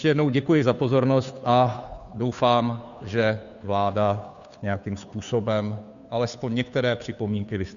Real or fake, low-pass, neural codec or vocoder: fake; 7.2 kHz; codec, 16 kHz, 2 kbps, FunCodec, trained on Chinese and English, 25 frames a second